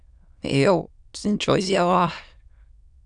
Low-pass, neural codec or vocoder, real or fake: 9.9 kHz; autoencoder, 22.05 kHz, a latent of 192 numbers a frame, VITS, trained on many speakers; fake